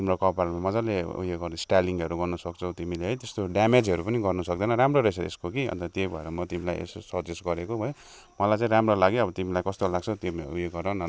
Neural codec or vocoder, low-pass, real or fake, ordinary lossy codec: none; none; real; none